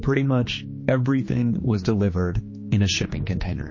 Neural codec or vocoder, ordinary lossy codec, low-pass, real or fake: codec, 16 kHz, 2 kbps, X-Codec, HuBERT features, trained on general audio; MP3, 32 kbps; 7.2 kHz; fake